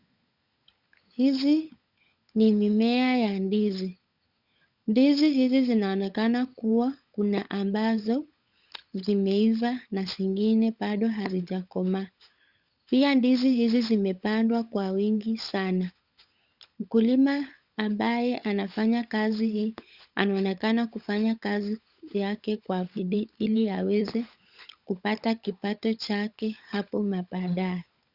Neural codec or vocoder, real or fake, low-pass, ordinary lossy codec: codec, 16 kHz, 16 kbps, FunCodec, trained on LibriTTS, 50 frames a second; fake; 5.4 kHz; Opus, 64 kbps